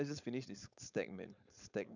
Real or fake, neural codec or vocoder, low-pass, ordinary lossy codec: fake; codec, 16 kHz, 4.8 kbps, FACodec; 7.2 kHz; none